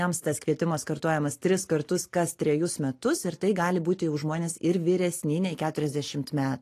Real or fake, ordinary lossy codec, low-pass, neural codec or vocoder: real; AAC, 48 kbps; 14.4 kHz; none